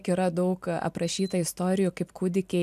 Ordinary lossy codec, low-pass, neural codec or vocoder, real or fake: MP3, 96 kbps; 14.4 kHz; none; real